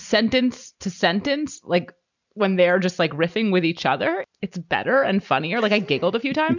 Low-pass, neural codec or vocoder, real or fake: 7.2 kHz; none; real